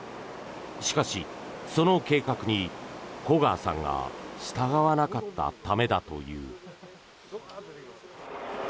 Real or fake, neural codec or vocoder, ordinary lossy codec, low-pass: real; none; none; none